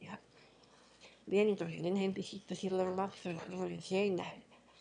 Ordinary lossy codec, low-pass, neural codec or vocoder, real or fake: none; 9.9 kHz; autoencoder, 22.05 kHz, a latent of 192 numbers a frame, VITS, trained on one speaker; fake